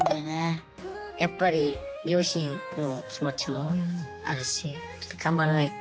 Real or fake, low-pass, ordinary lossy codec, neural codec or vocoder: fake; none; none; codec, 16 kHz, 2 kbps, X-Codec, HuBERT features, trained on general audio